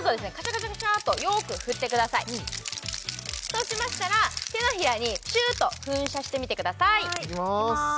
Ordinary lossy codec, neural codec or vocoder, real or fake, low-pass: none; none; real; none